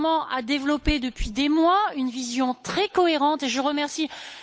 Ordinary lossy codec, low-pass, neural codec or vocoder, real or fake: none; none; codec, 16 kHz, 8 kbps, FunCodec, trained on Chinese and English, 25 frames a second; fake